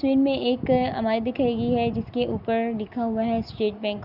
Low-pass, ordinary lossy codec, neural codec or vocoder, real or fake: 5.4 kHz; Opus, 64 kbps; none; real